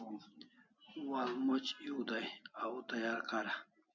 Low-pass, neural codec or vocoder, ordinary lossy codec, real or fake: 7.2 kHz; none; MP3, 48 kbps; real